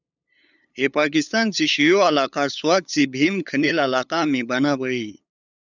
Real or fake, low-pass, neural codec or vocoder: fake; 7.2 kHz; codec, 16 kHz, 8 kbps, FunCodec, trained on LibriTTS, 25 frames a second